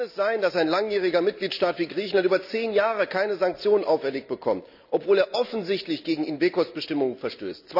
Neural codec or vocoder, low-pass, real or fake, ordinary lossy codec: none; 5.4 kHz; real; none